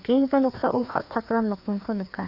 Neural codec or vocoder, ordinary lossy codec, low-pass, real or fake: codec, 16 kHz, 2 kbps, FreqCodec, larger model; none; 5.4 kHz; fake